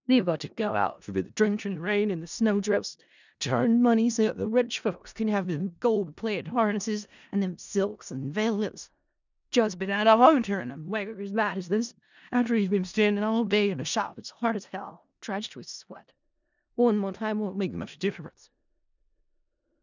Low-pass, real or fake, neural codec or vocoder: 7.2 kHz; fake; codec, 16 kHz in and 24 kHz out, 0.4 kbps, LongCat-Audio-Codec, four codebook decoder